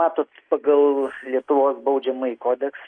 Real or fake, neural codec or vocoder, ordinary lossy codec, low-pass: real; none; AAC, 48 kbps; 9.9 kHz